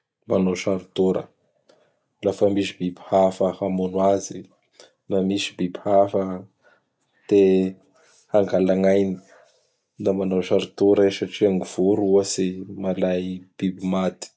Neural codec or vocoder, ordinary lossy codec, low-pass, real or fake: none; none; none; real